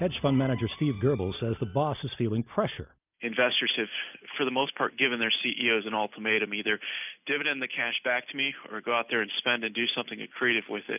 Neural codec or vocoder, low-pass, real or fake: none; 3.6 kHz; real